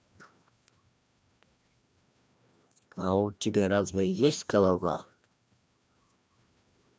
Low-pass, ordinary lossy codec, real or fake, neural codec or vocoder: none; none; fake; codec, 16 kHz, 1 kbps, FreqCodec, larger model